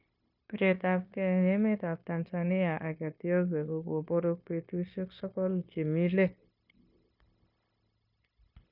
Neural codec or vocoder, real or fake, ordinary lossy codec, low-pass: codec, 16 kHz, 0.9 kbps, LongCat-Audio-Codec; fake; none; 5.4 kHz